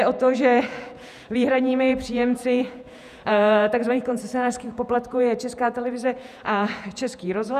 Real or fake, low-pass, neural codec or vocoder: fake; 14.4 kHz; vocoder, 48 kHz, 128 mel bands, Vocos